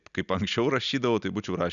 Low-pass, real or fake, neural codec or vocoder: 7.2 kHz; real; none